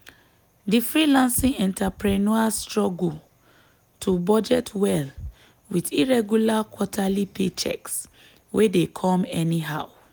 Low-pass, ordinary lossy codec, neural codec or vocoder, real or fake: none; none; none; real